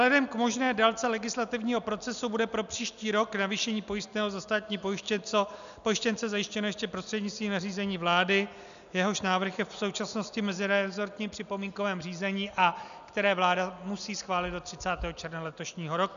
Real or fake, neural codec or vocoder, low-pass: real; none; 7.2 kHz